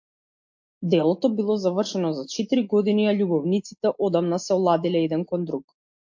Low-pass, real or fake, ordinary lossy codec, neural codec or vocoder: 7.2 kHz; real; MP3, 48 kbps; none